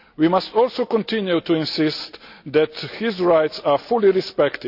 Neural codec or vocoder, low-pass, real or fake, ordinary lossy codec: none; 5.4 kHz; real; none